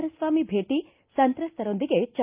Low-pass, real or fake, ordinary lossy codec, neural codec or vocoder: 3.6 kHz; real; Opus, 24 kbps; none